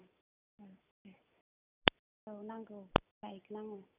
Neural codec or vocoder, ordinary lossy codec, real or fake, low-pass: none; none; real; 3.6 kHz